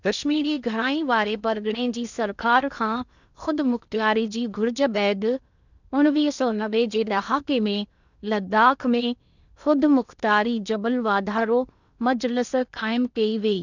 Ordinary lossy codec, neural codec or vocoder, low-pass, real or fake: none; codec, 16 kHz in and 24 kHz out, 0.8 kbps, FocalCodec, streaming, 65536 codes; 7.2 kHz; fake